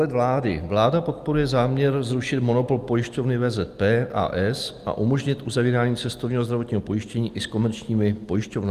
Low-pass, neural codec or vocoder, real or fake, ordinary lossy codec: 14.4 kHz; none; real; Opus, 32 kbps